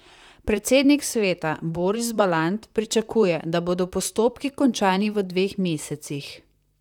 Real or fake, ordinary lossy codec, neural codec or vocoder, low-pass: fake; none; vocoder, 44.1 kHz, 128 mel bands, Pupu-Vocoder; 19.8 kHz